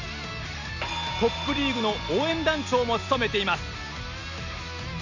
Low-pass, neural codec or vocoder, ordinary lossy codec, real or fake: 7.2 kHz; none; none; real